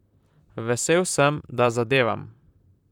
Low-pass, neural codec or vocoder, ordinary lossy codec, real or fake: 19.8 kHz; vocoder, 44.1 kHz, 128 mel bands, Pupu-Vocoder; none; fake